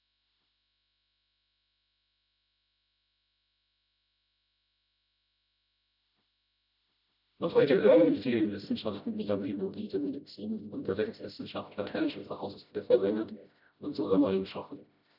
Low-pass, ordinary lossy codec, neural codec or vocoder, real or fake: 5.4 kHz; none; codec, 16 kHz, 0.5 kbps, FreqCodec, smaller model; fake